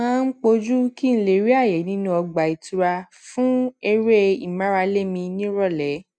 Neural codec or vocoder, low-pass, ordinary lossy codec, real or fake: none; none; none; real